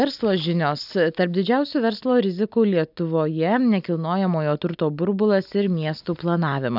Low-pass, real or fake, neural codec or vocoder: 5.4 kHz; real; none